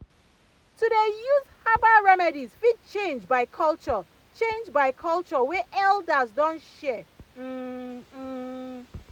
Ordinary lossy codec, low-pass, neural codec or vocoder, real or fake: none; 19.8 kHz; none; real